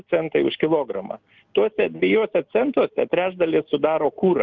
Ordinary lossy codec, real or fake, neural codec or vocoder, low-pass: Opus, 24 kbps; real; none; 7.2 kHz